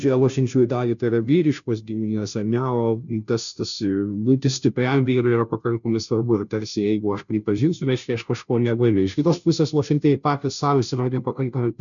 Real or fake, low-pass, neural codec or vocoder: fake; 7.2 kHz; codec, 16 kHz, 0.5 kbps, FunCodec, trained on Chinese and English, 25 frames a second